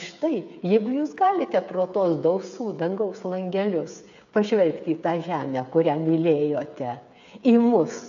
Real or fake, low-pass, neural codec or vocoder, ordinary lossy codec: fake; 7.2 kHz; codec, 16 kHz, 8 kbps, FreqCodec, smaller model; AAC, 96 kbps